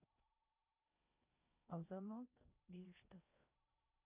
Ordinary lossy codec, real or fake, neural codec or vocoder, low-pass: none; fake; codec, 16 kHz in and 24 kHz out, 0.8 kbps, FocalCodec, streaming, 65536 codes; 3.6 kHz